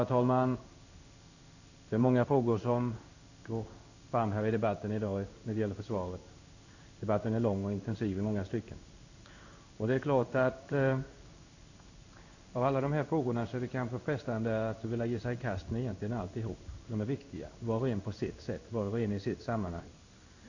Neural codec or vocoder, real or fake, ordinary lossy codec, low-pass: codec, 16 kHz in and 24 kHz out, 1 kbps, XY-Tokenizer; fake; none; 7.2 kHz